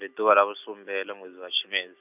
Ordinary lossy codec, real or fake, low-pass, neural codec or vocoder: none; real; 3.6 kHz; none